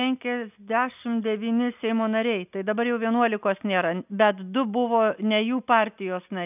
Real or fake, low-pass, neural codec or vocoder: real; 3.6 kHz; none